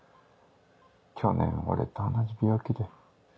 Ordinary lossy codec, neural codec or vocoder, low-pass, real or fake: none; none; none; real